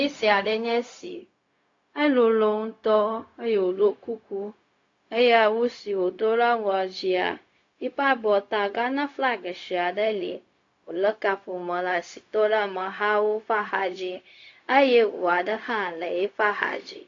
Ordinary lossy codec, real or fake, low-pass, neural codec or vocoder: AAC, 48 kbps; fake; 7.2 kHz; codec, 16 kHz, 0.4 kbps, LongCat-Audio-Codec